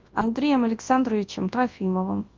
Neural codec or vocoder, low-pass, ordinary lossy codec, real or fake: codec, 24 kHz, 0.9 kbps, WavTokenizer, large speech release; 7.2 kHz; Opus, 24 kbps; fake